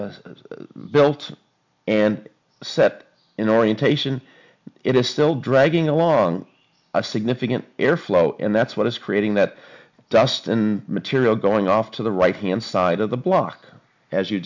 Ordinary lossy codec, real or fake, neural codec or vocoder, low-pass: MP3, 64 kbps; real; none; 7.2 kHz